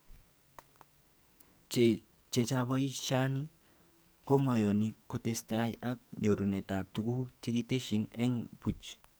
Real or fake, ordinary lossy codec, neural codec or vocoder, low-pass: fake; none; codec, 44.1 kHz, 2.6 kbps, SNAC; none